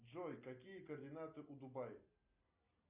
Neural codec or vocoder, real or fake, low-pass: none; real; 3.6 kHz